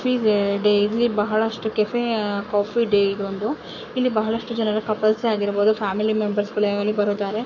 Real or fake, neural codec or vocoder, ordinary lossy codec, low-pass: fake; codec, 44.1 kHz, 7.8 kbps, Pupu-Codec; none; 7.2 kHz